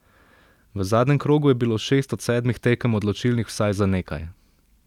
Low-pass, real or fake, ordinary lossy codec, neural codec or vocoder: 19.8 kHz; real; none; none